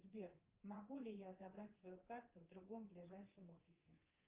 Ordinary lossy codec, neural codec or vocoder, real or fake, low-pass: Opus, 32 kbps; codec, 24 kHz, 3.1 kbps, DualCodec; fake; 3.6 kHz